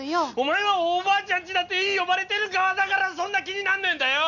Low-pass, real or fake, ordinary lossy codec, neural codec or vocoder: 7.2 kHz; real; none; none